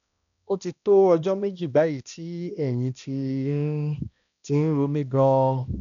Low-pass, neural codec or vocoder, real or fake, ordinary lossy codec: 7.2 kHz; codec, 16 kHz, 1 kbps, X-Codec, HuBERT features, trained on balanced general audio; fake; none